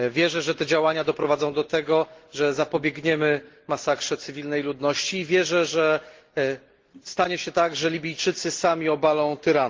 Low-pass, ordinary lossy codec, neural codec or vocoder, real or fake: 7.2 kHz; Opus, 16 kbps; none; real